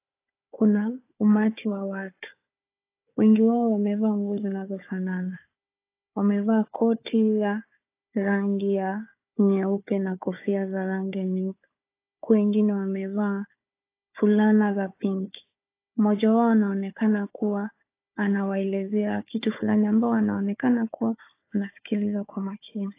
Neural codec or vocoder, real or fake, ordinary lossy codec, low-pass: codec, 16 kHz, 4 kbps, FunCodec, trained on Chinese and English, 50 frames a second; fake; AAC, 24 kbps; 3.6 kHz